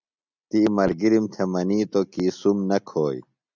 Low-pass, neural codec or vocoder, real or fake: 7.2 kHz; none; real